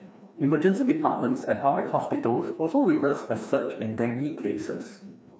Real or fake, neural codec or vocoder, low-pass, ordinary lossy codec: fake; codec, 16 kHz, 1 kbps, FreqCodec, larger model; none; none